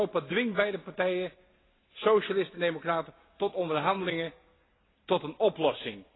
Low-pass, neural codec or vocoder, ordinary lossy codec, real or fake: 7.2 kHz; none; AAC, 16 kbps; real